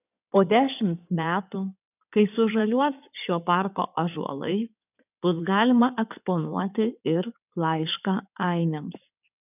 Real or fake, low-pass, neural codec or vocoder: fake; 3.6 kHz; codec, 16 kHz in and 24 kHz out, 2.2 kbps, FireRedTTS-2 codec